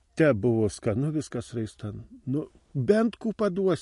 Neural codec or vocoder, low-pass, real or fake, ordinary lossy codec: none; 14.4 kHz; real; MP3, 48 kbps